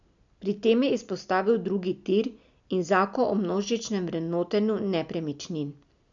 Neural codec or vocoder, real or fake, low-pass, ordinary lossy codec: none; real; 7.2 kHz; none